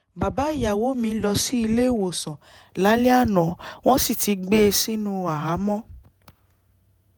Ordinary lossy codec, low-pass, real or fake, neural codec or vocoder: none; none; fake; vocoder, 48 kHz, 128 mel bands, Vocos